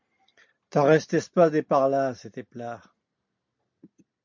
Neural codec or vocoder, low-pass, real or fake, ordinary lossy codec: none; 7.2 kHz; real; MP3, 64 kbps